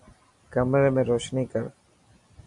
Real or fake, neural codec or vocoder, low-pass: real; none; 10.8 kHz